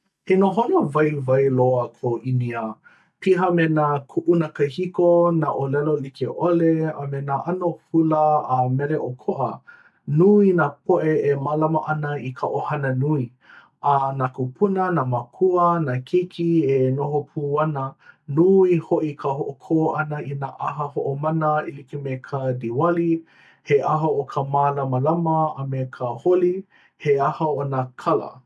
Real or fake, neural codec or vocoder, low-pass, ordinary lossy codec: real; none; none; none